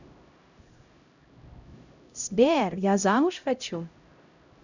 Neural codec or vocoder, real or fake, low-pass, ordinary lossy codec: codec, 16 kHz, 0.5 kbps, X-Codec, HuBERT features, trained on LibriSpeech; fake; 7.2 kHz; none